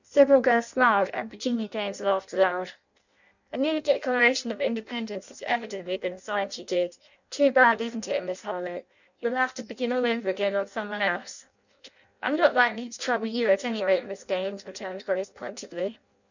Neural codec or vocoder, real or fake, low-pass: codec, 16 kHz in and 24 kHz out, 0.6 kbps, FireRedTTS-2 codec; fake; 7.2 kHz